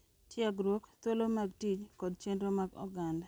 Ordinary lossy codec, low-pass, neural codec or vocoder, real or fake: none; none; none; real